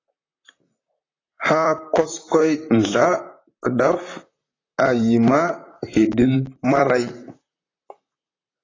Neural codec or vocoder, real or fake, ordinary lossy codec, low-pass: vocoder, 44.1 kHz, 128 mel bands, Pupu-Vocoder; fake; AAC, 32 kbps; 7.2 kHz